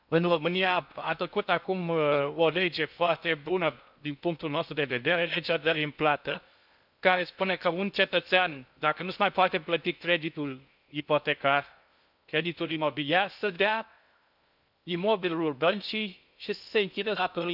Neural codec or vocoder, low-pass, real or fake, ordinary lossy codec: codec, 16 kHz in and 24 kHz out, 0.6 kbps, FocalCodec, streaming, 2048 codes; 5.4 kHz; fake; none